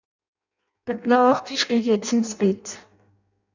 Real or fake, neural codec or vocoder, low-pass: fake; codec, 16 kHz in and 24 kHz out, 0.6 kbps, FireRedTTS-2 codec; 7.2 kHz